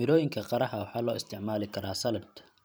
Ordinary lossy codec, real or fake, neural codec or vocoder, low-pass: none; fake; vocoder, 44.1 kHz, 128 mel bands every 512 samples, BigVGAN v2; none